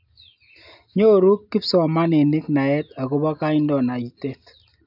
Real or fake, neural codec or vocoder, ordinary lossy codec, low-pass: real; none; AAC, 48 kbps; 5.4 kHz